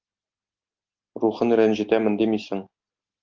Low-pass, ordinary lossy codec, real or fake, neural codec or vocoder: 7.2 kHz; Opus, 32 kbps; real; none